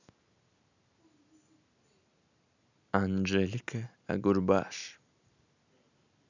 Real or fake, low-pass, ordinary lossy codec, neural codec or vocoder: real; 7.2 kHz; none; none